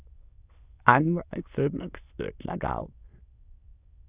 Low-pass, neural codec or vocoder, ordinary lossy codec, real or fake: 3.6 kHz; autoencoder, 22.05 kHz, a latent of 192 numbers a frame, VITS, trained on many speakers; Opus, 64 kbps; fake